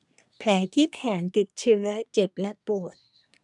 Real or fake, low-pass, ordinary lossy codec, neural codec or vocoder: fake; 10.8 kHz; none; codec, 24 kHz, 1 kbps, SNAC